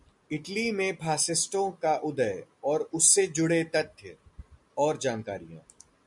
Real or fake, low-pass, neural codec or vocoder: real; 10.8 kHz; none